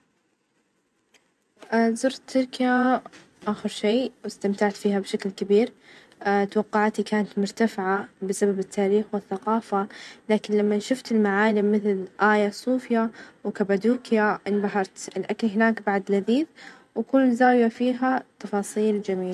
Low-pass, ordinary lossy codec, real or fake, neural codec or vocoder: none; none; fake; vocoder, 24 kHz, 100 mel bands, Vocos